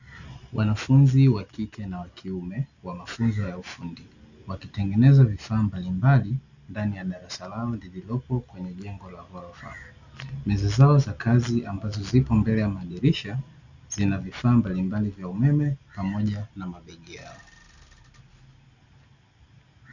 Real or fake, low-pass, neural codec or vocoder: real; 7.2 kHz; none